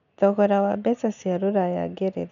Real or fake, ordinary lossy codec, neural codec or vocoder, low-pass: real; none; none; 7.2 kHz